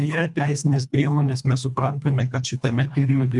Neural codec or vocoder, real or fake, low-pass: codec, 24 kHz, 1.5 kbps, HILCodec; fake; 10.8 kHz